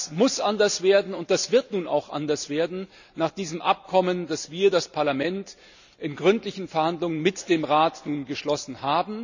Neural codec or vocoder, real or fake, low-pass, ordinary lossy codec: none; real; 7.2 kHz; none